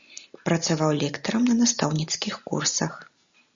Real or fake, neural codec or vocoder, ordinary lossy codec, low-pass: real; none; Opus, 64 kbps; 7.2 kHz